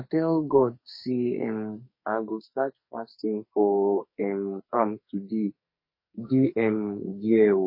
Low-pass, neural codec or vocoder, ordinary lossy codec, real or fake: 5.4 kHz; codec, 44.1 kHz, 2.6 kbps, SNAC; MP3, 24 kbps; fake